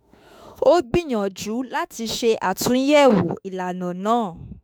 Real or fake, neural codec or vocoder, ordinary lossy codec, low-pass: fake; autoencoder, 48 kHz, 32 numbers a frame, DAC-VAE, trained on Japanese speech; none; none